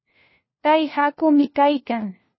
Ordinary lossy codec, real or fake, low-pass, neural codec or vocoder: MP3, 24 kbps; fake; 7.2 kHz; codec, 16 kHz, 1 kbps, FunCodec, trained on LibriTTS, 50 frames a second